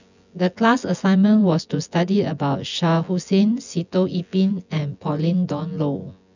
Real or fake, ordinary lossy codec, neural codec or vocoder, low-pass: fake; none; vocoder, 24 kHz, 100 mel bands, Vocos; 7.2 kHz